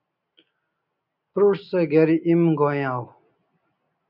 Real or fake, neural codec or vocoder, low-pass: real; none; 5.4 kHz